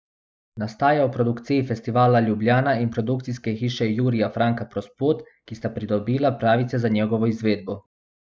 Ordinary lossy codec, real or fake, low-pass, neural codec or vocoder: none; real; none; none